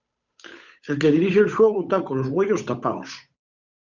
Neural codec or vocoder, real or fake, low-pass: codec, 16 kHz, 8 kbps, FunCodec, trained on Chinese and English, 25 frames a second; fake; 7.2 kHz